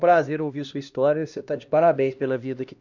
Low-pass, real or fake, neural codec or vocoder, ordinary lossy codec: 7.2 kHz; fake; codec, 16 kHz, 1 kbps, X-Codec, HuBERT features, trained on LibriSpeech; none